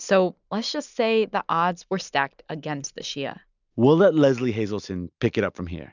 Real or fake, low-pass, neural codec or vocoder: real; 7.2 kHz; none